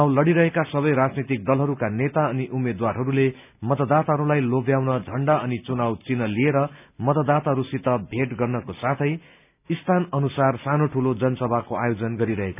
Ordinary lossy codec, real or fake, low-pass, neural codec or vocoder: none; real; 3.6 kHz; none